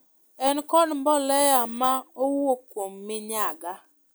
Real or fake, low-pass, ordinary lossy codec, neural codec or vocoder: real; none; none; none